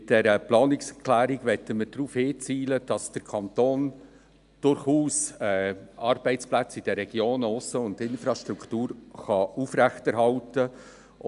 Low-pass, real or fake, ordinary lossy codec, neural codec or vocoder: 10.8 kHz; real; AAC, 96 kbps; none